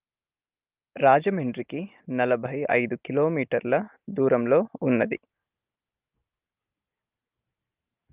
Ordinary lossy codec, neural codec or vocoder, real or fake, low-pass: Opus, 32 kbps; none; real; 3.6 kHz